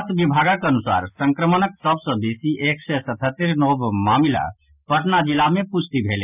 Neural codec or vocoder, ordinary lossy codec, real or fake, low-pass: none; none; real; 3.6 kHz